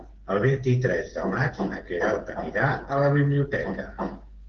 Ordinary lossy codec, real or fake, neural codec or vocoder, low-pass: Opus, 16 kbps; fake; codec, 16 kHz, 8 kbps, FreqCodec, smaller model; 7.2 kHz